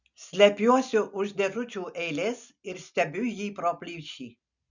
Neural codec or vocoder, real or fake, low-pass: none; real; 7.2 kHz